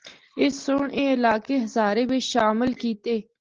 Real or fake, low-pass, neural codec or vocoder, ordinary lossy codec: real; 7.2 kHz; none; Opus, 16 kbps